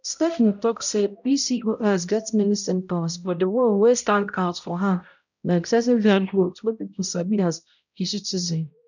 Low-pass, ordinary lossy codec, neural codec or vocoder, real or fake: 7.2 kHz; none; codec, 16 kHz, 0.5 kbps, X-Codec, HuBERT features, trained on balanced general audio; fake